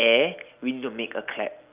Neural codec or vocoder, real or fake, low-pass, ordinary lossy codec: none; real; 3.6 kHz; Opus, 24 kbps